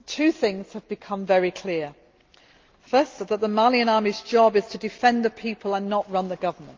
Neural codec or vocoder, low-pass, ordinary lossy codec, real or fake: none; 7.2 kHz; Opus, 32 kbps; real